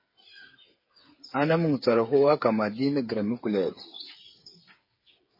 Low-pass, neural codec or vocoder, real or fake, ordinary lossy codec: 5.4 kHz; codec, 16 kHz, 8 kbps, FreqCodec, smaller model; fake; MP3, 24 kbps